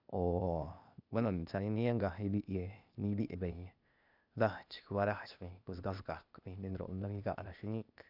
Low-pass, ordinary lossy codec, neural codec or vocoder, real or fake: 5.4 kHz; none; codec, 16 kHz, 0.8 kbps, ZipCodec; fake